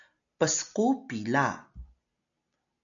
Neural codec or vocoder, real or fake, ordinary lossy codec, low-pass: none; real; MP3, 96 kbps; 7.2 kHz